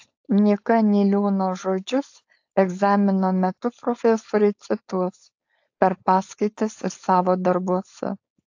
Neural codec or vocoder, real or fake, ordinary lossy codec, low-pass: codec, 16 kHz, 4.8 kbps, FACodec; fake; AAC, 48 kbps; 7.2 kHz